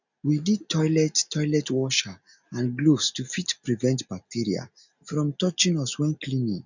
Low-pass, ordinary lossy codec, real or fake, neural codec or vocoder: 7.2 kHz; none; real; none